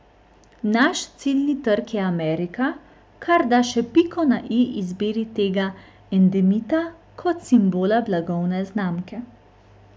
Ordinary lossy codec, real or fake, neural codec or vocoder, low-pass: none; real; none; none